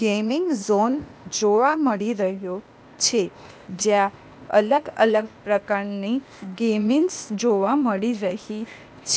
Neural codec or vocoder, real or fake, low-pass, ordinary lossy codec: codec, 16 kHz, 0.8 kbps, ZipCodec; fake; none; none